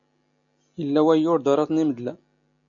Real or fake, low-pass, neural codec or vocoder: real; 7.2 kHz; none